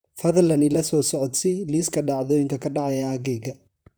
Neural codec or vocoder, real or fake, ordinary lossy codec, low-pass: vocoder, 44.1 kHz, 128 mel bands, Pupu-Vocoder; fake; none; none